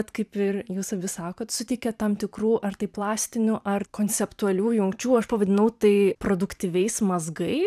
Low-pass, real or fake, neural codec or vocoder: 14.4 kHz; fake; vocoder, 44.1 kHz, 128 mel bands every 512 samples, BigVGAN v2